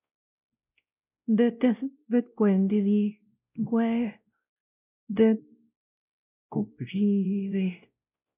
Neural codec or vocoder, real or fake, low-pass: codec, 16 kHz, 0.5 kbps, X-Codec, WavLM features, trained on Multilingual LibriSpeech; fake; 3.6 kHz